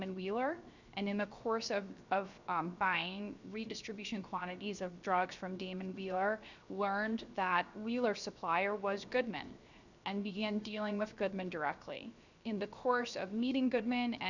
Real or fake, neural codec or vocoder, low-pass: fake; codec, 16 kHz, 0.7 kbps, FocalCodec; 7.2 kHz